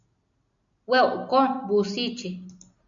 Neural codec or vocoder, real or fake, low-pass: none; real; 7.2 kHz